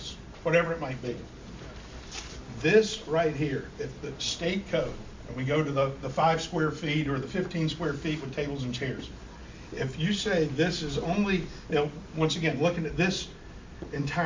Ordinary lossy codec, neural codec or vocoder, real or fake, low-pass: MP3, 48 kbps; none; real; 7.2 kHz